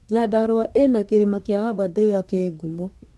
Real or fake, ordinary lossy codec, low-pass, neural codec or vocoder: fake; none; none; codec, 24 kHz, 1 kbps, SNAC